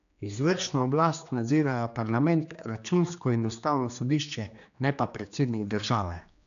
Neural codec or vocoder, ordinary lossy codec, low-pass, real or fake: codec, 16 kHz, 2 kbps, X-Codec, HuBERT features, trained on general audio; none; 7.2 kHz; fake